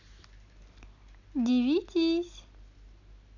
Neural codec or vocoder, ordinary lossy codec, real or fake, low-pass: none; none; real; 7.2 kHz